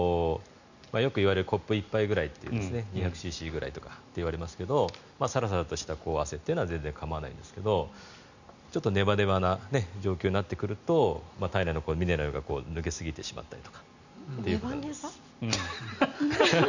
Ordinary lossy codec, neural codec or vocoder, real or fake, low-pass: none; none; real; 7.2 kHz